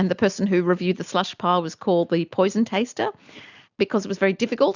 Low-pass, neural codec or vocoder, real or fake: 7.2 kHz; none; real